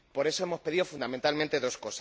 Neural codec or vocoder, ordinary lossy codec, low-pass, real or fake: none; none; none; real